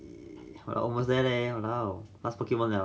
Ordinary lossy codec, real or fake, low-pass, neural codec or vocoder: none; real; none; none